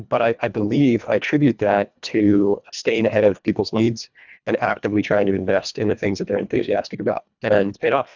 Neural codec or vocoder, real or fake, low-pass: codec, 24 kHz, 1.5 kbps, HILCodec; fake; 7.2 kHz